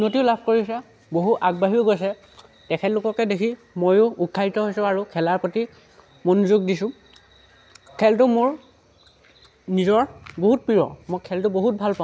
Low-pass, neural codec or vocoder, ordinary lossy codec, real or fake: none; none; none; real